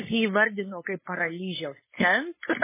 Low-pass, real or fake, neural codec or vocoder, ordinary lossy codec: 3.6 kHz; fake; codec, 16 kHz, 4.8 kbps, FACodec; MP3, 16 kbps